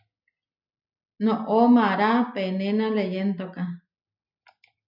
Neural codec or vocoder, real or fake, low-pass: none; real; 5.4 kHz